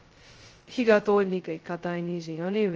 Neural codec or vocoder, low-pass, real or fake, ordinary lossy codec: codec, 16 kHz, 0.2 kbps, FocalCodec; 7.2 kHz; fake; Opus, 24 kbps